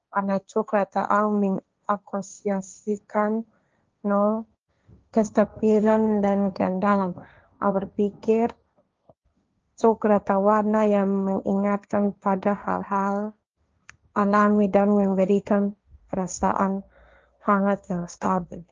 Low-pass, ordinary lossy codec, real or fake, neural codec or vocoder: 7.2 kHz; Opus, 24 kbps; fake; codec, 16 kHz, 1.1 kbps, Voila-Tokenizer